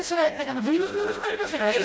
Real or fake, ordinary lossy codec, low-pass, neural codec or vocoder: fake; none; none; codec, 16 kHz, 0.5 kbps, FreqCodec, smaller model